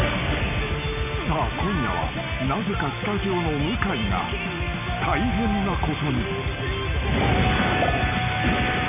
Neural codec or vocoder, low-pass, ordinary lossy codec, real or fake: none; 3.6 kHz; none; real